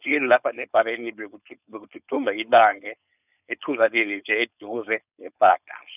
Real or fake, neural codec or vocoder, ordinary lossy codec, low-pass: fake; codec, 16 kHz, 4.8 kbps, FACodec; none; 3.6 kHz